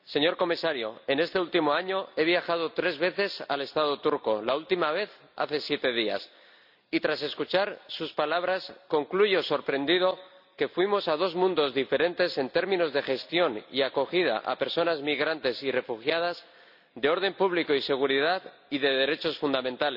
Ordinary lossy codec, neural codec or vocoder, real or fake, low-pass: none; none; real; 5.4 kHz